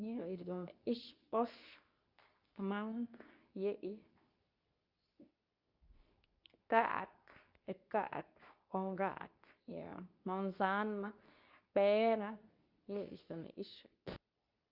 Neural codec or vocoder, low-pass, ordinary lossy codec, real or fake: codec, 24 kHz, 0.9 kbps, WavTokenizer, medium speech release version 2; 5.4 kHz; Opus, 64 kbps; fake